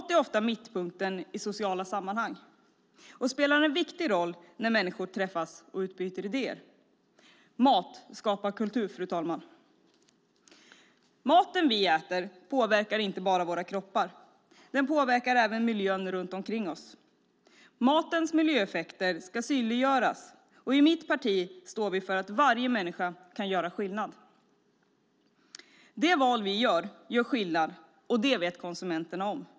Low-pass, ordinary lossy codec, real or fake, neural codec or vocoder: none; none; real; none